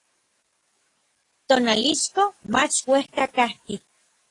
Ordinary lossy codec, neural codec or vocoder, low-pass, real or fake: AAC, 32 kbps; codec, 44.1 kHz, 7.8 kbps, DAC; 10.8 kHz; fake